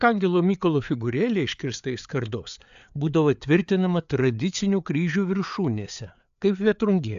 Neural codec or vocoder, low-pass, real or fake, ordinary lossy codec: codec, 16 kHz, 4 kbps, FreqCodec, larger model; 7.2 kHz; fake; AAC, 96 kbps